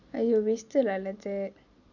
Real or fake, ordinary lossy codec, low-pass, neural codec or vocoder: real; none; 7.2 kHz; none